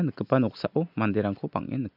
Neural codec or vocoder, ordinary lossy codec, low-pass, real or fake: none; none; 5.4 kHz; real